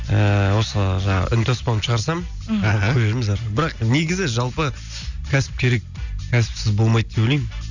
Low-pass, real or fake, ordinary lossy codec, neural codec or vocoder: 7.2 kHz; real; none; none